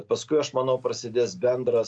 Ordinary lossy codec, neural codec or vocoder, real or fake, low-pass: AAC, 64 kbps; none; real; 9.9 kHz